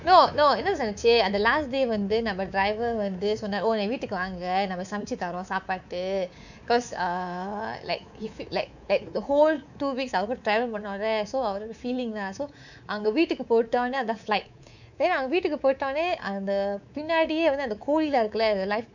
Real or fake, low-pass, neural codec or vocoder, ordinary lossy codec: fake; 7.2 kHz; codec, 24 kHz, 3.1 kbps, DualCodec; none